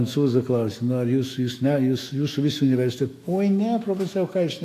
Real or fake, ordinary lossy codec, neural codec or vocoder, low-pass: fake; AAC, 64 kbps; autoencoder, 48 kHz, 128 numbers a frame, DAC-VAE, trained on Japanese speech; 14.4 kHz